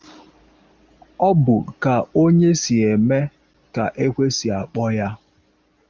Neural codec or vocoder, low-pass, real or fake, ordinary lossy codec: none; 7.2 kHz; real; Opus, 32 kbps